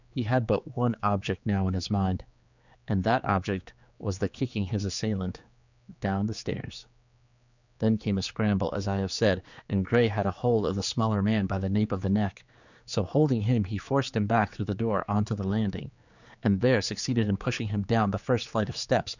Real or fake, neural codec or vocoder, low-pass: fake; codec, 16 kHz, 4 kbps, X-Codec, HuBERT features, trained on general audio; 7.2 kHz